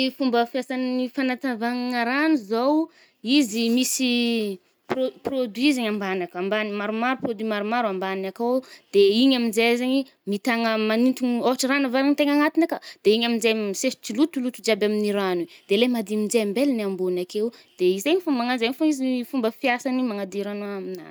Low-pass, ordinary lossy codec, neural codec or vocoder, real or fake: none; none; none; real